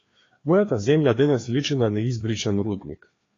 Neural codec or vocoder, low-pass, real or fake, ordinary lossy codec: codec, 16 kHz, 2 kbps, FreqCodec, larger model; 7.2 kHz; fake; AAC, 32 kbps